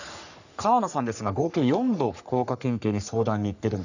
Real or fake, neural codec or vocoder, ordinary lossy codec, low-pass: fake; codec, 44.1 kHz, 3.4 kbps, Pupu-Codec; none; 7.2 kHz